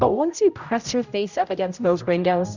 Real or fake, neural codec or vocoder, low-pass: fake; codec, 16 kHz, 0.5 kbps, X-Codec, HuBERT features, trained on general audio; 7.2 kHz